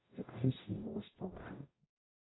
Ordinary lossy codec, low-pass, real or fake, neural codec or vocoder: AAC, 16 kbps; 7.2 kHz; fake; codec, 44.1 kHz, 0.9 kbps, DAC